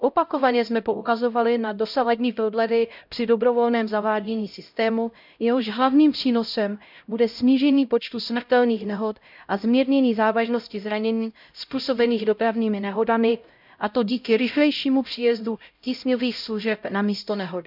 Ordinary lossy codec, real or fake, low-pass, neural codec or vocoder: none; fake; 5.4 kHz; codec, 16 kHz, 0.5 kbps, X-Codec, HuBERT features, trained on LibriSpeech